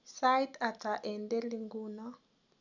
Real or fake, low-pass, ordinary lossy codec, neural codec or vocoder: real; 7.2 kHz; none; none